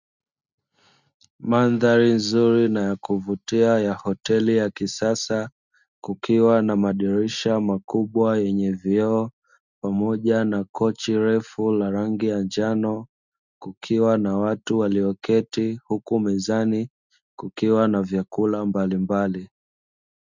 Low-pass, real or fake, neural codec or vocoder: 7.2 kHz; real; none